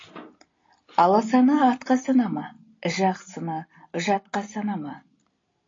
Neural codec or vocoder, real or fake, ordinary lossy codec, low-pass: none; real; AAC, 32 kbps; 7.2 kHz